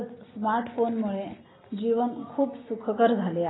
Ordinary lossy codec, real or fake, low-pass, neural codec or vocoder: AAC, 16 kbps; real; 7.2 kHz; none